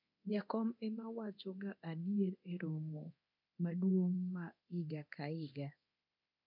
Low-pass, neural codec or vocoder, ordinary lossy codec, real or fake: 5.4 kHz; codec, 24 kHz, 0.9 kbps, DualCodec; none; fake